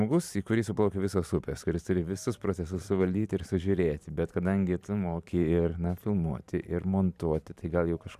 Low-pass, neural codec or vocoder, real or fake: 14.4 kHz; vocoder, 44.1 kHz, 128 mel bands every 512 samples, BigVGAN v2; fake